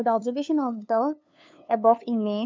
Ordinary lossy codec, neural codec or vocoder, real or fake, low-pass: MP3, 64 kbps; codec, 16 kHz, 2 kbps, FunCodec, trained on LibriTTS, 25 frames a second; fake; 7.2 kHz